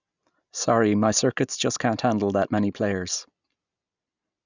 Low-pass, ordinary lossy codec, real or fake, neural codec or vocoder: 7.2 kHz; none; real; none